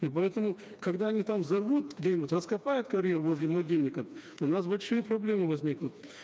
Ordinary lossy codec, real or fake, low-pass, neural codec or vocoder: none; fake; none; codec, 16 kHz, 2 kbps, FreqCodec, smaller model